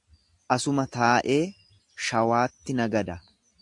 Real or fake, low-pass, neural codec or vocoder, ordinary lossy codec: real; 10.8 kHz; none; AAC, 64 kbps